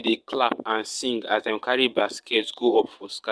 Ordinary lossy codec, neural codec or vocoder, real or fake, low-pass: none; vocoder, 22.05 kHz, 80 mel bands, WaveNeXt; fake; none